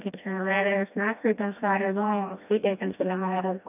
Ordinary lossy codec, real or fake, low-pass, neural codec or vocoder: none; fake; 3.6 kHz; codec, 16 kHz, 1 kbps, FreqCodec, smaller model